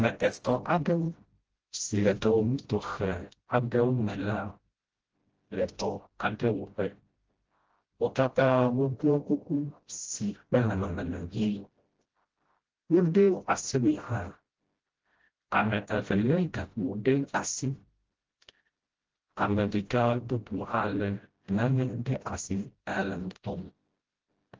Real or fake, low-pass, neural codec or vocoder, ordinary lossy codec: fake; 7.2 kHz; codec, 16 kHz, 0.5 kbps, FreqCodec, smaller model; Opus, 16 kbps